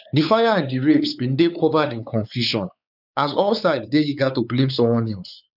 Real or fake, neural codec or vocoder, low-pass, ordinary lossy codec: fake; codec, 16 kHz, 4 kbps, X-Codec, WavLM features, trained on Multilingual LibriSpeech; 5.4 kHz; none